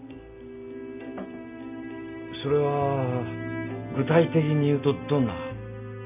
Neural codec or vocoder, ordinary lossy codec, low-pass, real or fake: none; none; 3.6 kHz; real